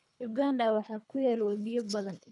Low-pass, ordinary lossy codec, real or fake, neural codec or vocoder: 10.8 kHz; none; fake; codec, 24 kHz, 3 kbps, HILCodec